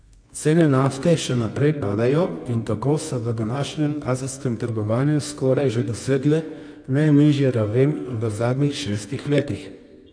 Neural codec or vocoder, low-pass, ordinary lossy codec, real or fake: codec, 24 kHz, 0.9 kbps, WavTokenizer, medium music audio release; 9.9 kHz; none; fake